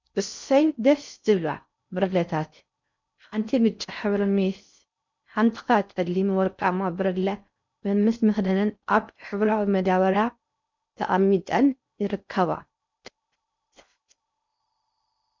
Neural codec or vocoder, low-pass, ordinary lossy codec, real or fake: codec, 16 kHz in and 24 kHz out, 0.6 kbps, FocalCodec, streaming, 4096 codes; 7.2 kHz; MP3, 64 kbps; fake